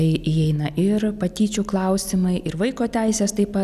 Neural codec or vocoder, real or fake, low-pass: none; real; 14.4 kHz